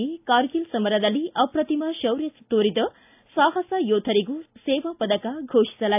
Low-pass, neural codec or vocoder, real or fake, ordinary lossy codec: 3.6 kHz; none; real; AAC, 32 kbps